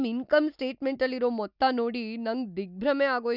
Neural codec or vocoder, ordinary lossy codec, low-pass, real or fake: none; none; 5.4 kHz; real